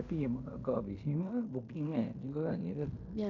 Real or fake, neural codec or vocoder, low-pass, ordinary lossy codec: fake; codec, 16 kHz in and 24 kHz out, 0.9 kbps, LongCat-Audio-Codec, fine tuned four codebook decoder; 7.2 kHz; MP3, 64 kbps